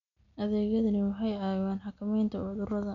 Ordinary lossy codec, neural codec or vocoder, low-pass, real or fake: none; none; 7.2 kHz; real